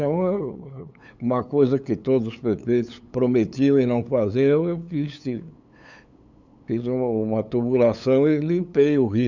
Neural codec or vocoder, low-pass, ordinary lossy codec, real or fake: codec, 16 kHz, 8 kbps, FunCodec, trained on LibriTTS, 25 frames a second; 7.2 kHz; none; fake